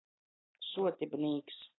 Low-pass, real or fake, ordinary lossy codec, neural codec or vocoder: 7.2 kHz; real; AAC, 16 kbps; none